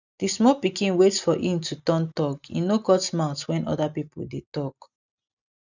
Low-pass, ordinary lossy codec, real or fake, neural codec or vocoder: 7.2 kHz; none; real; none